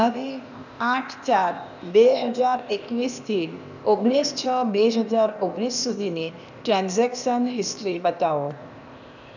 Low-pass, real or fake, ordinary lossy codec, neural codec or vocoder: 7.2 kHz; fake; none; codec, 16 kHz, 0.8 kbps, ZipCodec